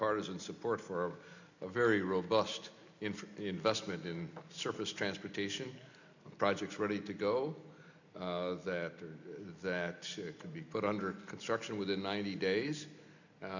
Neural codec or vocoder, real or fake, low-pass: none; real; 7.2 kHz